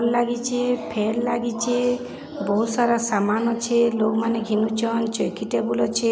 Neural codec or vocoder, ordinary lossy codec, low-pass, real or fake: none; none; none; real